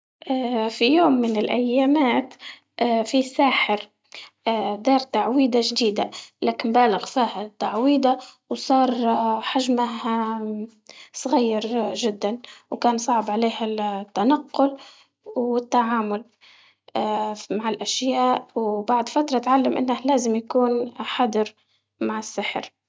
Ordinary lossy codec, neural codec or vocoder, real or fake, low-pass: none; none; real; none